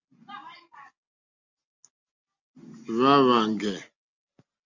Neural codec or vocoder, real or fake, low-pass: none; real; 7.2 kHz